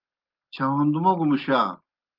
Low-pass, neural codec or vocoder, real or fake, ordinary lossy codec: 5.4 kHz; none; real; Opus, 32 kbps